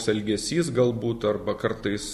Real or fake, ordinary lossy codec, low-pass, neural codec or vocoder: real; MP3, 64 kbps; 14.4 kHz; none